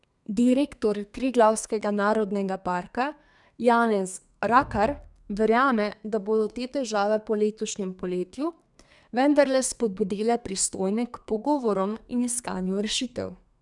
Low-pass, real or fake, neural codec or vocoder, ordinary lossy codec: 10.8 kHz; fake; codec, 32 kHz, 1.9 kbps, SNAC; none